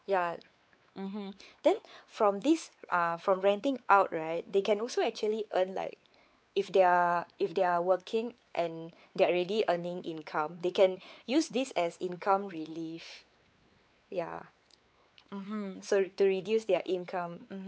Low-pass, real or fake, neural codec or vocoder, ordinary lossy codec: none; fake; codec, 16 kHz, 4 kbps, X-Codec, WavLM features, trained on Multilingual LibriSpeech; none